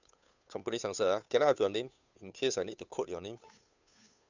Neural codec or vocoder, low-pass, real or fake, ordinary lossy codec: codec, 16 kHz, 2 kbps, FunCodec, trained on Chinese and English, 25 frames a second; 7.2 kHz; fake; none